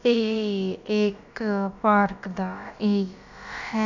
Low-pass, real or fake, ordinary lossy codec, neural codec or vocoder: 7.2 kHz; fake; none; codec, 16 kHz, about 1 kbps, DyCAST, with the encoder's durations